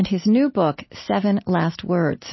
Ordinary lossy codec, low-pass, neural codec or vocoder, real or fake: MP3, 24 kbps; 7.2 kHz; none; real